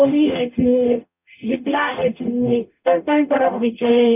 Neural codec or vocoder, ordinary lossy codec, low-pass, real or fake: codec, 44.1 kHz, 0.9 kbps, DAC; none; 3.6 kHz; fake